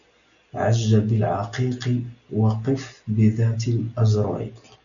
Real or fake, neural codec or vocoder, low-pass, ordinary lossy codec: real; none; 7.2 kHz; MP3, 96 kbps